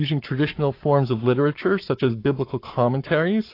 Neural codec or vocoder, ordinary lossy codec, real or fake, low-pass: codec, 44.1 kHz, 7.8 kbps, Pupu-Codec; AAC, 32 kbps; fake; 5.4 kHz